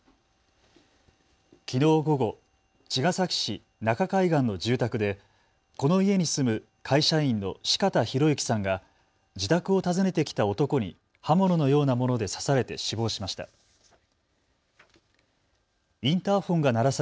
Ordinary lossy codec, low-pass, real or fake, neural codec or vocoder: none; none; real; none